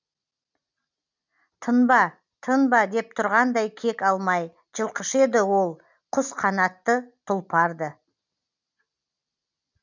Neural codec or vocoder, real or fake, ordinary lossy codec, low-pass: none; real; none; 7.2 kHz